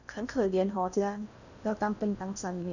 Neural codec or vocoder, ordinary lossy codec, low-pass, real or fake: codec, 16 kHz in and 24 kHz out, 0.6 kbps, FocalCodec, streaming, 4096 codes; none; 7.2 kHz; fake